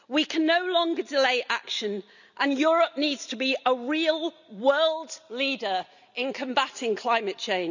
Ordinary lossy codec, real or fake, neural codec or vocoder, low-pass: none; real; none; 7.2 kHz